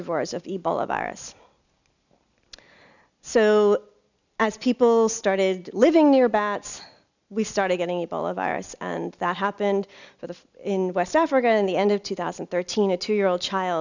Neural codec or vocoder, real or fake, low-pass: none; real; 7.2 kHz